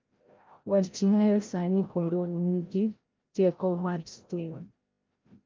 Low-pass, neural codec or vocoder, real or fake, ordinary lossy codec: 7.2 kHz; codec, 16 kHz, 0.5 kbps, FreqCodec, larger model; fake; Opus, 24 kbps